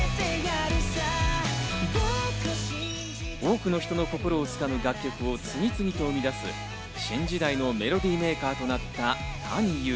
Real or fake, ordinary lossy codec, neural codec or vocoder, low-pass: real; none; none; none